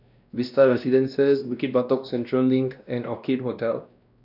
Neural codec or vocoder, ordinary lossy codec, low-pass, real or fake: codec, 16 kHz, 1 kbps, X-Codec, WavLM features, trained on Multilingual LibriSpeech; none; 5.4 kHz; fake